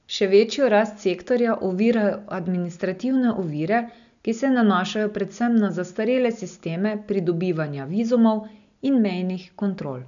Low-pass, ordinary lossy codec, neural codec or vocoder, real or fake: 7.2 kHz; none; none; real